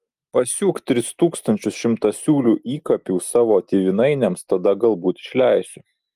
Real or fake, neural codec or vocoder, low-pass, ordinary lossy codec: real; none; 14.4 kHz; Opus, 32 kbps